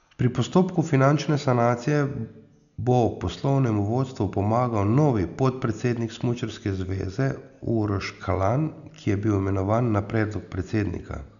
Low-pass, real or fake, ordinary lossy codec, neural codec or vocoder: 7.2 kHz; real; none; none